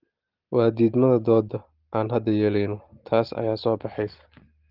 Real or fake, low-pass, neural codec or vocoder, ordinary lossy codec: real; 5.4 kHz; none; Opus, 16 kbps